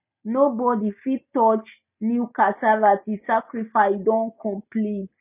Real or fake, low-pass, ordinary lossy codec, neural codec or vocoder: real; 3.6 kHz; none; none